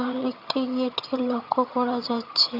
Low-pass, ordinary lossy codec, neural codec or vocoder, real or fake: 5.4 kHz; none; vocoder, 22.05 kHz, 80 mel bands, HiFi-GAN; fake